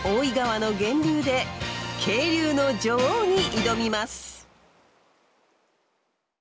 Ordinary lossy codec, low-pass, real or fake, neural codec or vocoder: none; none; real; none